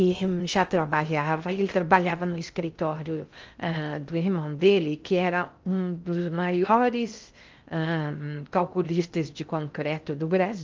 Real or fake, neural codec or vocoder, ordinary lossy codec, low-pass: fake; codec, 16 kHz in and 24 kHz out, 0.6 kbps, FocalCodec, streaming, 2048 codes; Opus, 24 kbps; 7.2 kHz